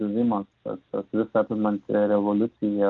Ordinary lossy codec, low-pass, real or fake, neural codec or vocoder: Opus, 32 kbps; 7.2 kHz; real; none